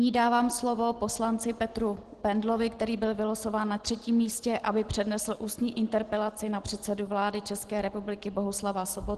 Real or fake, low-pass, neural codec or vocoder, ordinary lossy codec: real; 10.8 kHz; none; Opus, 16 kbps